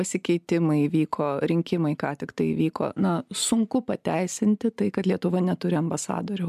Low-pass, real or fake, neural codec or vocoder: 14.4 kHz; real; none